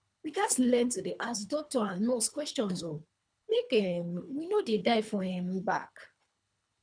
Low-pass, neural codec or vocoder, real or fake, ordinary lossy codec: 9.9 kHz; codec, 24 kHz, 3 kbps, HILCodec; fake; none